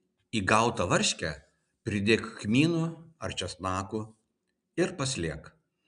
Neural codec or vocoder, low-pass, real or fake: none; 9.9 kHz; real